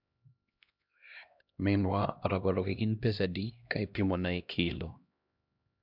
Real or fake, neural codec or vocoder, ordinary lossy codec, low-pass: fake; codec, 16 kHz, 1 kbps, X-Codec, HuBERT features, trained on LibriSpeech; MP3, 48 kbps; 5.4 kHz